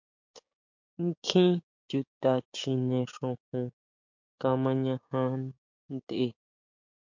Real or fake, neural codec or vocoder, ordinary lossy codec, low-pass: fake; codec, 16 kHz, 6 kbps, DAC; MP3, 48 kbps; 7.2 kHz